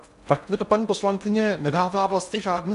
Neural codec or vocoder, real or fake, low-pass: codec, 16 kHz in and 24 kHz out, 0.6 kbps, FocalCodec, streaming, 2048 codes; fake; 10.8 kHz